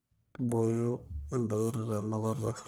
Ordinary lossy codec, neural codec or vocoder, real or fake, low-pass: none; codec, 44.1 kHz, 1.7 kbps, Pupu-Codec; fake; none